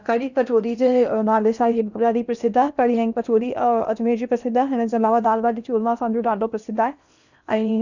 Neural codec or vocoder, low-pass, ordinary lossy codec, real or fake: codec, 16 kHz in and 24 kHz out, 0.8 kbps, FocalCodec, streaming, 65536 codes; 7.2 kHz; none; fake